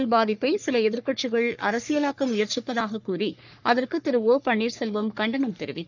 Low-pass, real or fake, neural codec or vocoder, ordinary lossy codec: 7.2 kHz; fake; codec, 44.1 kHz, 3.4 kbps, Pupu-Codec; none